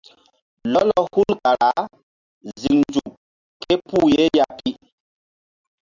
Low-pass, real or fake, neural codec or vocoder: 7.2 kHz; real; none